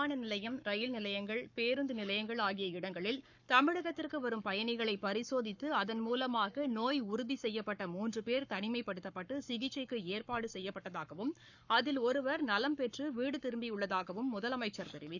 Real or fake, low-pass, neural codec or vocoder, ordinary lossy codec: fake; 7.2 kHz; codec, 44.1 kHz, 7.8 kbps, Pupu-Codec; none